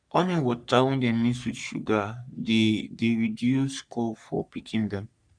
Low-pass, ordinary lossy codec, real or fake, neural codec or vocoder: 9.9 kHz; none; fake; codec, 44.1 kHz, 3.4 kbps, Pupu-Codec